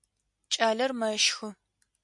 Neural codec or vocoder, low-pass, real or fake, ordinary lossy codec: none; 10.8 kHz; real; MP3, 64 kbps